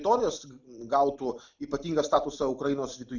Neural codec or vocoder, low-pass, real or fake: none; 7.2 kHz; real